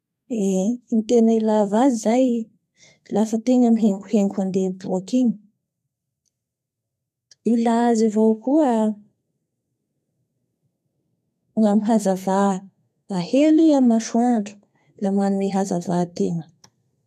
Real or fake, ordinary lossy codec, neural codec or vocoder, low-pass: fake; none; codec, 32 kHz, 1.9 kbps, SNAC; 14.4 kHz